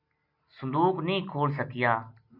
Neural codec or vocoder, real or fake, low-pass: none; real; 5.4 kHz